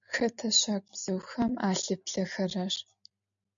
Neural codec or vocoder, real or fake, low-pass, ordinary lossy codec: none; real; 7.2 kHz; MP3, 64 kbps